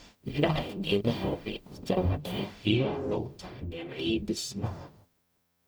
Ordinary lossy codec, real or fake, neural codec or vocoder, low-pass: none; fake; codec, 44.1 kHz, 0.9 kbps, DAC; none